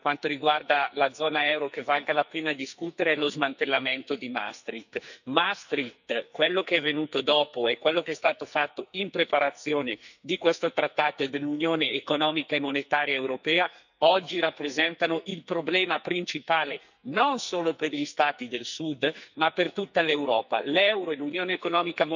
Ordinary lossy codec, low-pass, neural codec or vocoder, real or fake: none; 7.2 kHz; codec, 44.1 kHz, 2.6 kbps, SNAC; fake